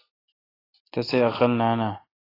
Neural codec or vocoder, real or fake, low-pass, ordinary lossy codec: none; real; 5.4 kHz; AAC, 24 kbps